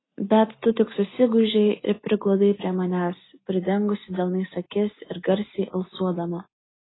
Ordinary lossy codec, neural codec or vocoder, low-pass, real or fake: AAC, 16 kbps; none; 7.2 kHz; real